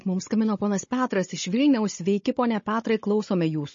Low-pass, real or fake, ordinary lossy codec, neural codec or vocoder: 7.2 kHz; fake; MP3, 32 kbps; codec, 16 kHz, 16 kbps, FunCodec, trained on Chinese and English, 50 frames a second